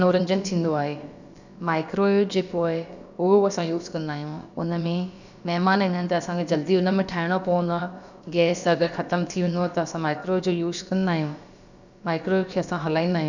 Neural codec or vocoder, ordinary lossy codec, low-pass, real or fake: codec, 16 kHz, about 1 kbps, DyCAST, with the encoder's durations; none; 7.2 kHz; fake